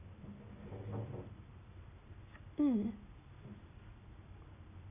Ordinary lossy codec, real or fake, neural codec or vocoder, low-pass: none; real; none; 3.6 kHz